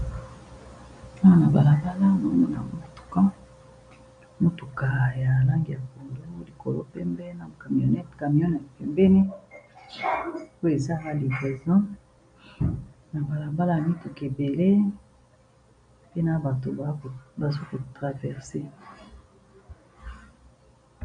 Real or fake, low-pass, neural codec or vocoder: real; 9.9 kHz; none